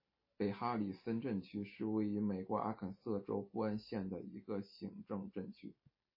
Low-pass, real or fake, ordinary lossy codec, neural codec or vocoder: 5.4 kHz; real; MP3, 24 kbps; none